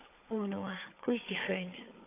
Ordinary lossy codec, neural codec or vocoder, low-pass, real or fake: AAC, 24 kbps; codec, 16 kHz, 4 kbps, FunCodec, trained on Chinese and English, 50 frames a second; 3.6 kHz; fake